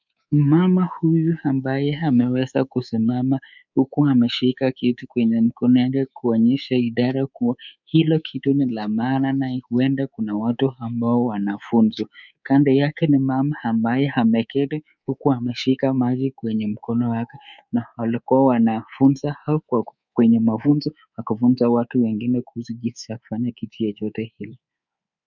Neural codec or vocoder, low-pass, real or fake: codec, 24 kHz, 3.1 kbps, DualCodec; 7.2 kHz; fake